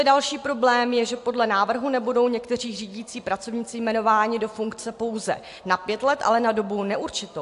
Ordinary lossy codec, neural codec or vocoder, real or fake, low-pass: AAC, 64 kbps; none; real; 10.8 kHz